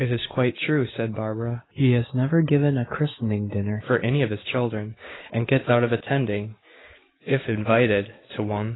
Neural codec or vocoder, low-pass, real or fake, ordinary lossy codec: codec, 16 kHz, 6 kbps, DAC; 7.2 kHz; fake; AAC, 16 kbps